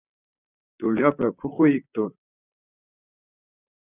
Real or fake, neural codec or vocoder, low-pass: fake; vocoder, 44.1 kHz, 128 mel bands, Pupu-Vocoder; 3.6 kHz